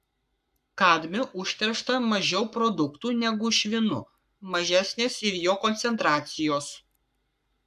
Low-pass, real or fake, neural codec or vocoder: 14.4 kHz; fake; codec, 44.1 kHz, 7.8 kbps, Pupu-Codec